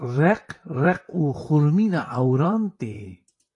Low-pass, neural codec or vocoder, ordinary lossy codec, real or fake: 10.8 kHz; vocoder, 44.1 kHz, 128 mel bands, Pupu-Vocoder; AAC, 48 kbps; fake